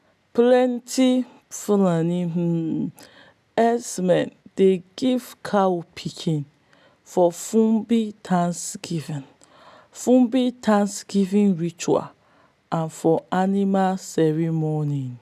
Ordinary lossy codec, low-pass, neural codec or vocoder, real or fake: none; 14.4 kHz; none; real